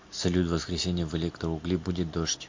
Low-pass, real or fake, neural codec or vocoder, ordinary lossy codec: 7.2 kHz; real; none; MP3, 48 kbps